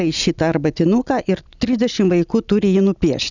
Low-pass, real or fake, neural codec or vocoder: 7.2 kHz; real; none